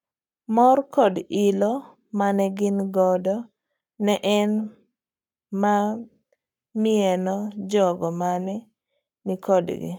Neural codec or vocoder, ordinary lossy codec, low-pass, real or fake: codec, 44.1 kHz, 7.8 kbps, Pupu-Codec; none; 19.8 kHz; fake